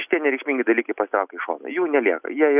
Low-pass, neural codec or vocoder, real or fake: 3.6 kHz; none; real